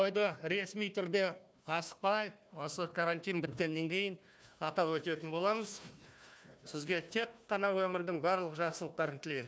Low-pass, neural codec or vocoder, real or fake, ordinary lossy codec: none; codec, 16 kHz, 1 kbps, FunCodec, trained on Chinese and English, 50 frames a second; fake; none